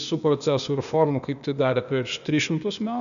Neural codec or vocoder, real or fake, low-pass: codec, 16 kHz, 0.8 kbps, ZipCodec; fake; 7.2 kHz